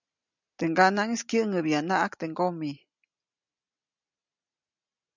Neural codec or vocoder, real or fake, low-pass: none; real; 7.2 kHz